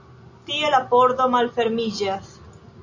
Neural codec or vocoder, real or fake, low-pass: none; real; 7.2 kHz